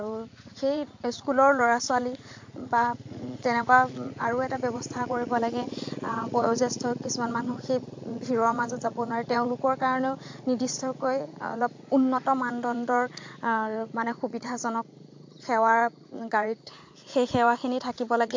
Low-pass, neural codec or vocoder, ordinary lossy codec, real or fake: 7.2 kHz; vocoder, 44.1 kHz, 80 mel bands, Vocos; MP3, 48 kbps; fake